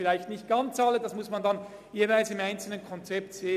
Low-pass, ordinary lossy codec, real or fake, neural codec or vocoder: 14.4 kHz; none; real; none